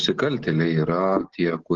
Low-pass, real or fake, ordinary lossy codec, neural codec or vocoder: 7.2 kHz; real; Opus, 16 kbps; none